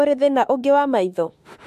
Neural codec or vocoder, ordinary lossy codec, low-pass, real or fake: autoencoder, 48 kHz, 32 numbers a frame, DAC-VAE, trained on Japanese speech; MP3, 64 kbps; 14.4 kHz; fake